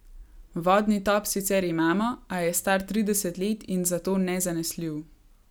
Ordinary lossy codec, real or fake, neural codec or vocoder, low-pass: none; real; none; none